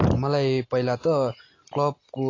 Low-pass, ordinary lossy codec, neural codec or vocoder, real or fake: 7.2 kHz; AAC, 32 kbps; none; real